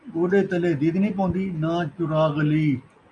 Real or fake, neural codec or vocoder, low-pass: real; none; 9.9 kHz